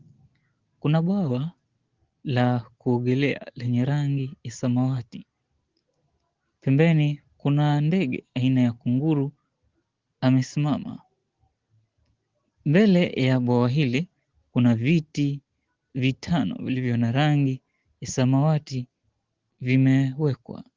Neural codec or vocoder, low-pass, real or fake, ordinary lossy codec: none; 7.2 kHz; real; Opus, 16 kbps